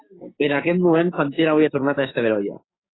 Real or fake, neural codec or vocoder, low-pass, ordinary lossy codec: fake; codec, 16 kHz, 6 kbps, DAC; 7.2 kHz; AAC, 16 kbps